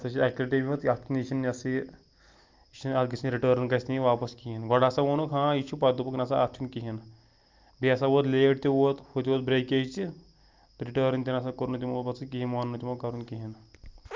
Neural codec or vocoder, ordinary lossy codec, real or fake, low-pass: none; Opus, 32 kbps; real; 7.2 kHz